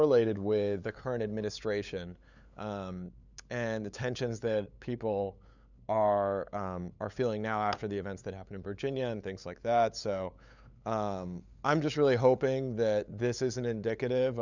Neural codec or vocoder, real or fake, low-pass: codec, 16 kHz, 8 kbps, FunCodec, trained on LibriTTS, 25 frames a second; fake; 7.2 kHz